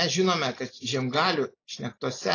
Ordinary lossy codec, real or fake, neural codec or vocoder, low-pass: AAC, 32 kbps; real; none; 7.2 kHz